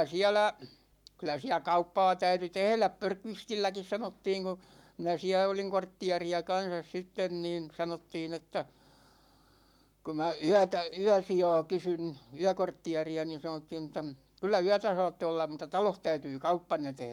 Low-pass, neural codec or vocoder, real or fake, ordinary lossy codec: 19.8 kHz; codec, 44.1 kHz, 7.8 kbps, Pupu-Codec; fake; none